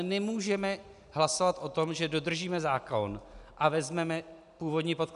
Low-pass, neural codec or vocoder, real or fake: 10.8 kHz; none; real